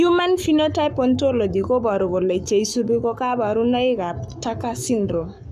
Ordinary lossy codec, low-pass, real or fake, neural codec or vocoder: none; 14.4 kHz; fake; codec, 44.1 kHz, 7.8 kbps, Pupu-Codec